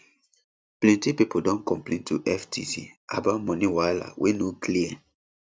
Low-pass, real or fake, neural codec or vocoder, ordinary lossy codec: none; real; none; none